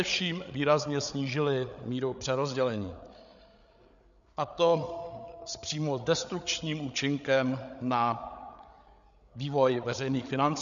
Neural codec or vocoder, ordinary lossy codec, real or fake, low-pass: codec, 16 kHz, 8 kbps, FreqCodec, larger model; MP3, 96 kbps; fake; 7.2 kHz